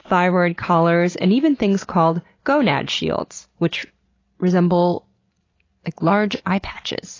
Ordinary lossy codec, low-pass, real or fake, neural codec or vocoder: AAC, 32 kbps; 7.2 kHz; real; none